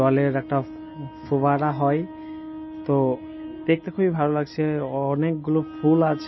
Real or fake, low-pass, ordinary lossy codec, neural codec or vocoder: real; 7.2 kHz; MP3, 24 kbps; none